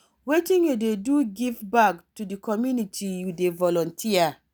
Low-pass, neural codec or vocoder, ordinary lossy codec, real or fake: none; none; none; real